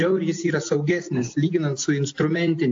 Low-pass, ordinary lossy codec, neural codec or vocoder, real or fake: 7.2 kHz; AAC, 48 kbps; none; real